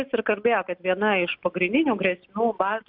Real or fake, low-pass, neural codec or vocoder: real; 5.4 kHz; none